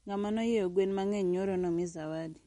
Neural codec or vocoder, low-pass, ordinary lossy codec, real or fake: none; 19.8 kHz; MP3, 48 kbps; real